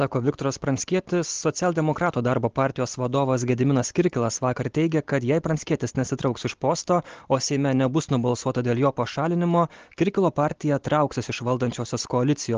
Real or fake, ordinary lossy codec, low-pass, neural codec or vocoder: real; Opus, 16 kbps; 7.2 kHz; none